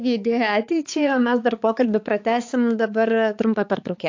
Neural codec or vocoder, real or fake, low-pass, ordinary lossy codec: codec, 16 kHz, 4 kbps, X-Codec, HuBERT features, trained on balanced general audio; fake; 7.2 kHz; AAC, 48 kbps